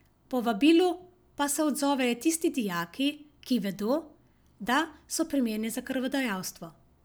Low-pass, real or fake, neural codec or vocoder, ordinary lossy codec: none; real; none; none